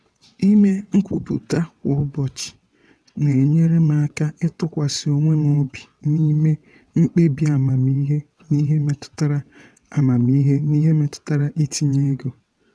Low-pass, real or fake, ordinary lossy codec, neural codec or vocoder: none; fake; none; vocoder, 22.05 kHz, 80 mel bands, WaveNeXt